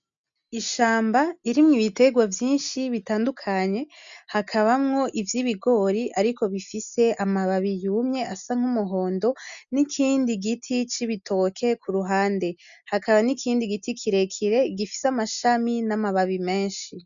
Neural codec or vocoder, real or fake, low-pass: none; real; 7.2 kHz